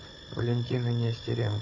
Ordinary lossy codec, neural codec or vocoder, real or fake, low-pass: MP3, 32 kbps; codec, 16 kHz, 16 kbps, FunCodec, trained on Chinese and English, 50 frames a second; fake; 7.2 kHz